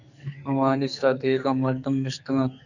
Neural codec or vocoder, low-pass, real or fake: codec, 44.1 kHz, 2.6 kbps, SNAC; 7.2 kHz; fake